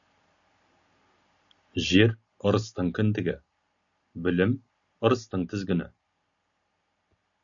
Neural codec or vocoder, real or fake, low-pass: none; real; 7.2 kHz